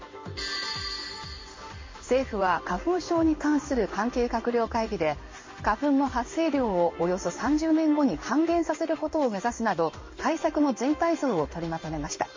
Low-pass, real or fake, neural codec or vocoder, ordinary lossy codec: 7.2 kHz; fake; codec, 16 kHz in and 24 kHz out, 1 kbps, XY-Tokenizer; MP3, 32 kbps